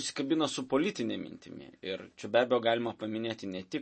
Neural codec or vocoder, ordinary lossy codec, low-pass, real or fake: none; MP3, 32 kbps; 10.8 kHz; real